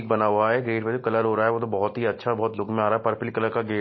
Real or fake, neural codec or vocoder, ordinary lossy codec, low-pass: real; none; MP3, 24 kbps; 7.2 kHz